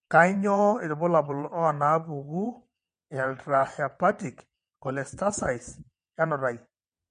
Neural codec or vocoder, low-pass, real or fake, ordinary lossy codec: vocoder, 22.05 kHz, 80 mel bands, WaveNeXt; 9.9 kHz; fake; MP3, 48 kbps